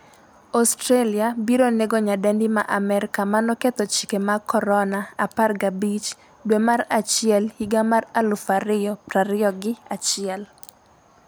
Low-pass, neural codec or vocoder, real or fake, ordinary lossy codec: none; none; real; none